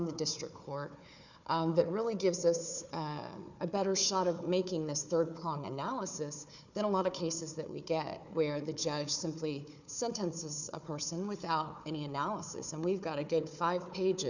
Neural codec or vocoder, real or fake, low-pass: codec, 16 kHz, 4 kbps, FunCodec, trained on Chinese and English, 50 frames a second; fake; 7.2 kHz